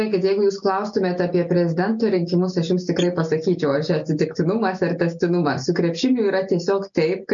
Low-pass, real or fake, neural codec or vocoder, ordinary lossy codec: 7.2 kHz; real; none; MP3, 64 kbps